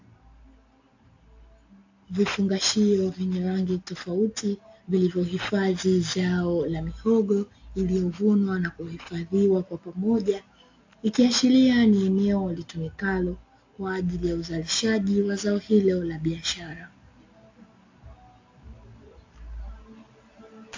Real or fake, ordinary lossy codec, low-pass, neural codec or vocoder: real; AAC, 48 kbps; 7.2 kHz; none